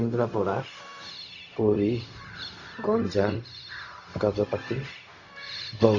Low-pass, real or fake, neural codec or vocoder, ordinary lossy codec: 7.2 kHz; fake; codec, 16 kHz, 0.4 kbps, LongCat-Audio-Codec; AAC, 32 kbps